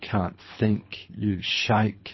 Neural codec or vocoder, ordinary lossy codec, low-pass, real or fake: codec, 24 kHz, 3 kbps, HILCodec; MP3, 24 kbps; 7.2 kHz; fake